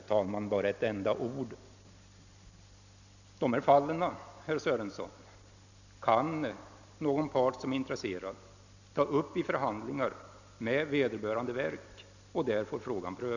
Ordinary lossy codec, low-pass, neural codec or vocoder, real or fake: none; 7.2 kHz; none; real